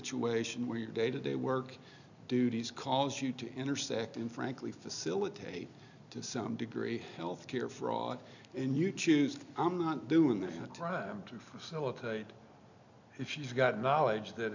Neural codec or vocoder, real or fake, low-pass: none; real; 7.2 kHz